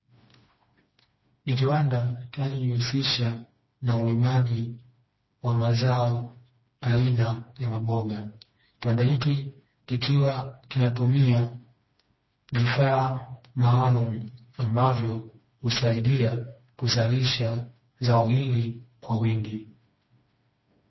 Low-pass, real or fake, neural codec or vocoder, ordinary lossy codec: 7.2 kHz; fake; codec, 16 kHz, 2 kbps, FreqCodec, smaller model; MP3, 24 kbps